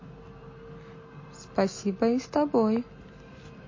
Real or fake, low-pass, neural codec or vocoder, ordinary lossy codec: real; 7.2 kHz; none; MP3, 32 kbps